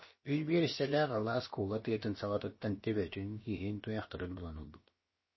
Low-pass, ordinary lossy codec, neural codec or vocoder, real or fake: 7.2 kHz; MP3, 24 kbps; codec, 16 kHz, about 1 kbps, DyCAST, with the encoder's durations; fake